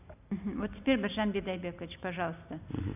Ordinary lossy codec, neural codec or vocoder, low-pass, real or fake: none; none; 3.6 kHz; real